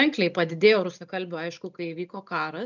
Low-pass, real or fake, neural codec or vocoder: 7.2 kHz; real; none